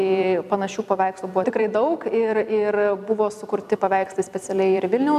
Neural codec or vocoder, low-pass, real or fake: vocoder, 44.1 kHz, 128 mel bands every 256 samples, BigVGAN v2; 14.4 kHz; fake